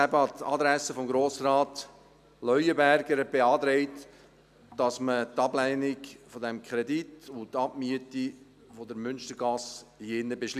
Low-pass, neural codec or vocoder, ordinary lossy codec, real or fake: 14.4 kHz; none; none; real